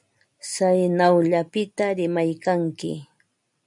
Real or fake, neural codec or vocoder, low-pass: real; none; 10.8 kHz